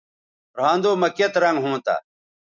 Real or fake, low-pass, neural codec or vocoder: real; 7.2 kHz; none